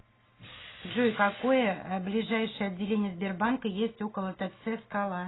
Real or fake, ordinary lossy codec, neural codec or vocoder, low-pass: real; AAC, 16 kbps; none; 7.2 kHz